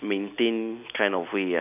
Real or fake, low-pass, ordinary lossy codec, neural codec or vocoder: real; 3.6 kHz; none; none